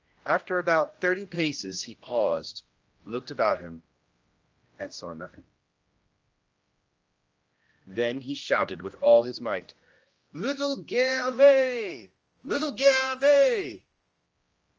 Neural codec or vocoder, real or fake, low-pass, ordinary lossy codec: codec, 16 kHz, 1 kbps, X-Codec, HuBERT features, trained on general audio; fake; 7.2 kHz; Opus, 24 kbps